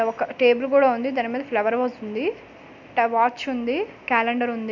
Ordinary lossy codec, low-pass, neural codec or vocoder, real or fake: none; 7.2 kHz; none; real